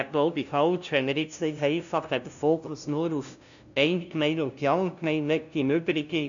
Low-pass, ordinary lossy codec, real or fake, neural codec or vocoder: 7.2 kHz; none; fake; codec, 16 kHz, 0.5 kbps, FunCodec, trained on LibriTTS, 25 frames a second